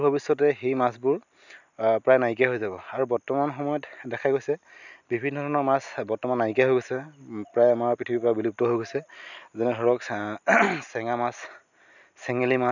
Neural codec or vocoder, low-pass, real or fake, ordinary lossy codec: none; 7.2 kHz; real; none